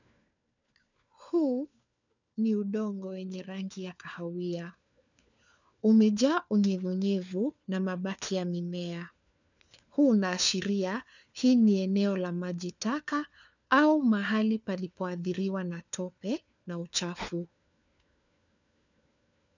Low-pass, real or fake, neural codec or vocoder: 7.2 kHz; fake; codec, 16 kHz, 4 kbps, FunCodec, trained on LibriTTS, 50 frames a second